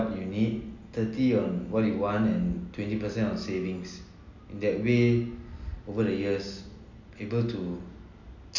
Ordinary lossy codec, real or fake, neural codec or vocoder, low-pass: none; real; none; 7.2 kHz